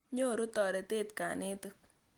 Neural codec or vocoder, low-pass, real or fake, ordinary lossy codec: none; 19.8 kHz; real; Opus, 24 kbps